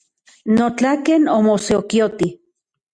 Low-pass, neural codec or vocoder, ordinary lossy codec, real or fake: 9.9 kHz; none; Opus, 64 kbps; real